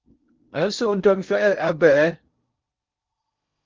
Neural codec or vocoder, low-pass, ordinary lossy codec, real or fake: codec, 16 kHz in and 24 kHz out, 0.6 kbps, FocalCodec, streaming, 4096 codes; 7.2 kHz; Opus, 24 kbps; fake